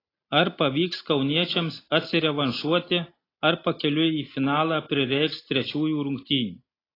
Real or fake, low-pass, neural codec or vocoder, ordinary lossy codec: real; 5.4 kHz; none; AAC, 24 kbps